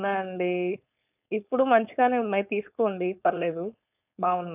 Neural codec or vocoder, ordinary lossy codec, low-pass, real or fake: codec, 16 kHz, 4.8 kbps, FACodec; none; 3.6 kHz; fake